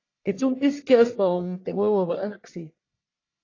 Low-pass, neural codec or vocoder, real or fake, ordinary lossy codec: 7.2 kHz; codec, 44.1 kHz, 1.7 kbps, Pupu-Codec; fake; AAC, 32 kbps